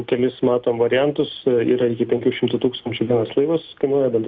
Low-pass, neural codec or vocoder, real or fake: 7.2 kHz; none; real